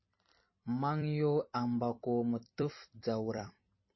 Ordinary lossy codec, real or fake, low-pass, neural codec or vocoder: MP3, 24 kbps; fake; 7.2 kHz; vocoder, 44.1 kHz, 128 mel bands every 256 samples, BigVGAN v2